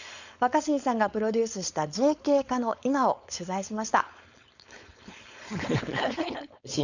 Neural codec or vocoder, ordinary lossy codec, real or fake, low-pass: codec, 16 kHz, 4.8 kbps, FACodec; none; fake; 7.2 kHz